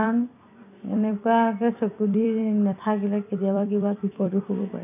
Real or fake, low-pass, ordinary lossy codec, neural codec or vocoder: fake; 3.6 kHz; none; vocoder, 44.1 kHz, 128 mel bands every 256 samples, BigVGAN v2